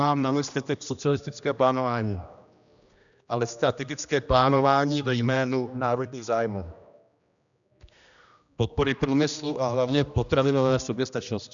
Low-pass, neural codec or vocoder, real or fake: 7.2 kHz; codec, 16 kHz, 1 kbps, X-Codec, HuBERT features, trained on general audio; fake